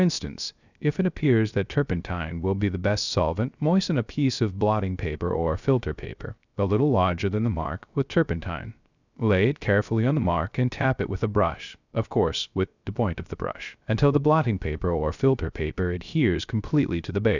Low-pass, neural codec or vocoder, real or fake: 7.2 kHz; codec, 16 kHz, 0.3 kbps, FocalCodec; fake